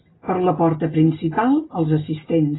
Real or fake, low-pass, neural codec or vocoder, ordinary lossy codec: real; 7.2 kHz; none; AAC, 16 kbps